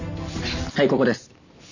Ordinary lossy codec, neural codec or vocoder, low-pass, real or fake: none; none; 7.2 kHz; real